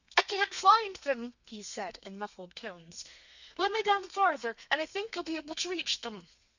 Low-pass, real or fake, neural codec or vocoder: 7.2 kHz; fake; codec, 32 kHz, 1.9 kbps, SNAC